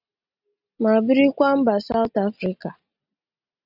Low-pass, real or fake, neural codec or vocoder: 5.4 kHz; real; none